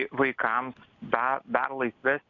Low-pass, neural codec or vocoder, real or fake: 7.2 kHz; none; real